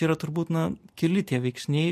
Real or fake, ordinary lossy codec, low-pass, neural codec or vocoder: real; MP3, 64 kbps; 14.4 kHz; none